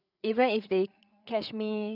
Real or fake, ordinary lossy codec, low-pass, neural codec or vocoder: fake; none; 5.4 kHz; codec, 16 kHz, 8 kbps, FreqCodec, larger model